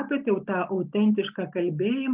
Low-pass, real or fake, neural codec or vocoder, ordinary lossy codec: 3.6 kHz; real; none; Opus, 32 kbps